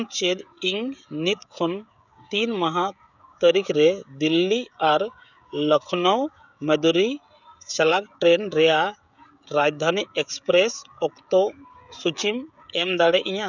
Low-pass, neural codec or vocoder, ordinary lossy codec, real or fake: 7.2 kHz; codec, 16 kHz, 16 kbps, FreqCodec, smaller model; none; fake